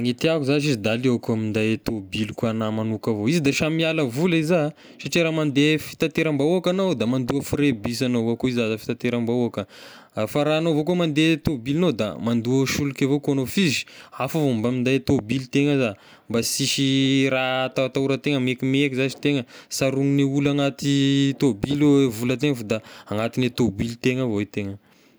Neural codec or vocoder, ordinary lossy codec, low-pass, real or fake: none; none; none; real